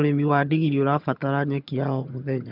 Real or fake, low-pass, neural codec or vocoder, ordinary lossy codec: fake; 5.4 kHz; vocoder, 22.05 kHz, 80 mel bands, HiFi-GAN; none